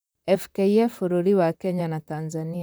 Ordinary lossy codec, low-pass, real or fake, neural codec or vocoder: none; none; fake; vocoder, 44.1 kHz, 128 mel bands, Pupu-Vocoder